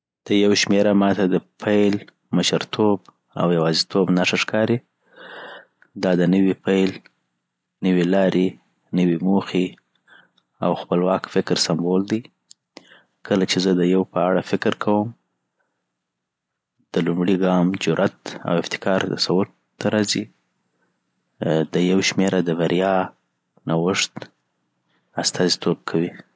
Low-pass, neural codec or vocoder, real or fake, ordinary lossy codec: none; none; real; none